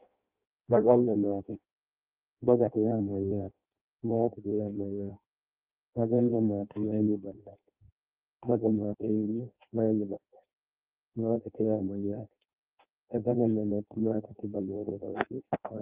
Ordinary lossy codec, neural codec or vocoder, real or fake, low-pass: Opus, 24 kbps; codec, 16 kHz in and 24 kHz out, 0.6 kbps, FireRedTTS-2 codec; fake; 3.6 kHz